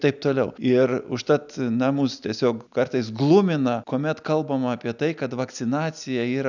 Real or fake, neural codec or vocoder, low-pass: real; none; 7.2 kHz